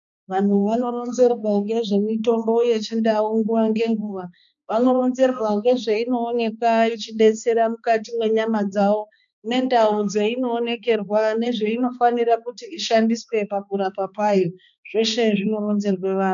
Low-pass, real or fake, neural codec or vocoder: 7.2 kHz; fake; codec, 16 kHz, 2 kbps, X-Codec, HuBERT features, trained on balanced general audio